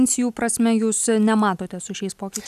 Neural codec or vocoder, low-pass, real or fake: none; 14.4 kHz; real